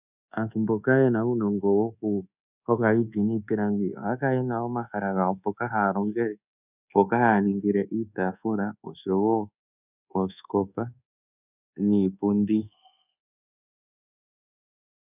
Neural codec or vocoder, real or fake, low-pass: codec, 24 kHz, 1.2 kbps, DualCodec; fake; 3.6 kHz